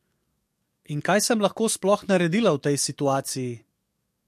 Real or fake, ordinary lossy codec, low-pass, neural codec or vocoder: fake; MP3, 64 kbps; 14.4 kHz; codec, 44.1 kHz, 7.8 kbps, DAC